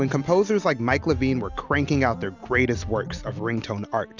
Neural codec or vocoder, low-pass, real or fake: none; 7.2 kHz; real